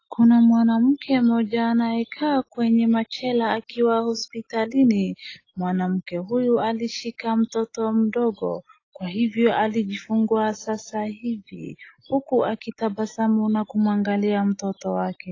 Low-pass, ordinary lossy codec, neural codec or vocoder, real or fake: 7.2 kHz; AAC, 32 kbps; none; real